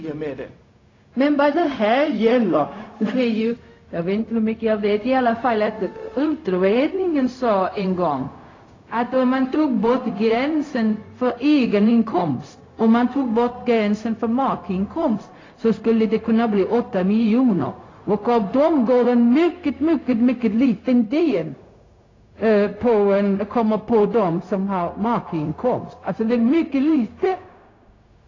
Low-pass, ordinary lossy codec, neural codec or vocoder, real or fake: 7.2 kHz; AAC, 32 kbps; codec, 16 kHz, 0.4 kbps, LongCat-Audio-Codec; fake